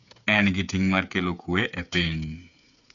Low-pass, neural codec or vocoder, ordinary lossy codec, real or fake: 7.2 kHz; codec, 16 kHz, 8 kbps, FreqCodec, smaller model; MP3, 96 kbps; fake